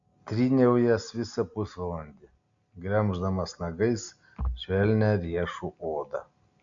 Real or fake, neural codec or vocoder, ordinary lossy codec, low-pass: real; none; AAC, 64 kbps; 7.2 kHz